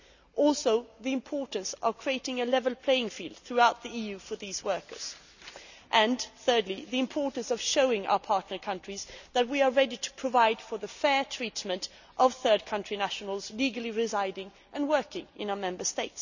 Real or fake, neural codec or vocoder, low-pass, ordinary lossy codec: real; none; 7.2 kHz; none